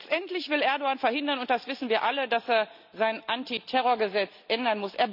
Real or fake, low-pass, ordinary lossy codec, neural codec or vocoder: real; 5.4 kHz; none; none